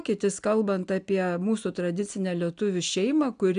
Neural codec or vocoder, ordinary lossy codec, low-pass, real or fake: none; AAC, 96 kbps; 9.9 kHz; real